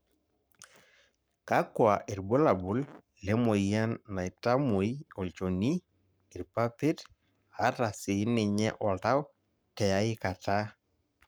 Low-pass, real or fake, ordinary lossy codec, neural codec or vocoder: none; fake; none; codec, 44.1 kHz, 7.8 kbps, Pupu-Codec